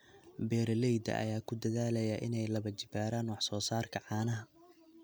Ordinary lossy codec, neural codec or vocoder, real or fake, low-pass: none; none; real; none